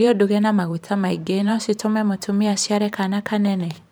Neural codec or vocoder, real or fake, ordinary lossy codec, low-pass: vocoder, 44.1 kHz, 128 mel bands every 512 samples, BigVGAN v2; fake; none; none